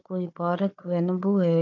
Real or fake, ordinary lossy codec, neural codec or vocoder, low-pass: fake; none; codec, 16 kHz, 4 kbps, FunCodec, trained on Chinese and English, 50 frames a second; 7.2 kHz